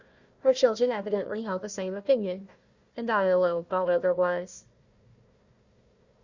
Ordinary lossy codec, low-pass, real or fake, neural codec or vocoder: Opus, 64 kbps; 7.2 kHz; fake; codec, 16 kHz, 1 kbps, FunCodec, trained on Chinese and English, 50 frames a second